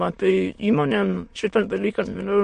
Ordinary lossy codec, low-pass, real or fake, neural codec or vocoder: MP3, 48 kbps; 9.9 kHz; fake; autoencoder, 22.05 kHz, a latent of 192 numbers a frame, VITS, trained on many speakers